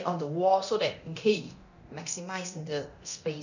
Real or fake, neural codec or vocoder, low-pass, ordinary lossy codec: fake; codec, 24 kHz, 0.9 kbps, DualCodec; 7.2 kHz; none